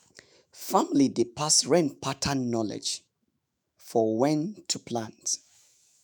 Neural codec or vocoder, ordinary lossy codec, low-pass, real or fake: autoencoder, 48 kHz, 128 numbers a frame, DAC-VAE, trained on Japanese speech; none; none; fake